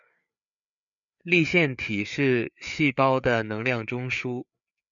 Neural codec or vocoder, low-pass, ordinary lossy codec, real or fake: codec, 16 kHz, 4 kbps, FreqCodec, larger model; 7.2 kHz; MP3, 96 kbps; fake